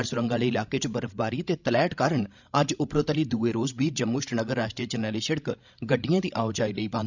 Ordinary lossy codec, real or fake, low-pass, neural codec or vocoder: none; fake; 7.2 kHz; codec, 16 kHz, 16 kbps, FreqCodec, larger model